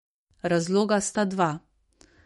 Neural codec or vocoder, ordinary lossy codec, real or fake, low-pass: autoencoder, 48 kHz, 128 numbers a frame, DAC-VAE, trained on Japanese speech; MP3, 48 kbps; fake; 19.8 kHz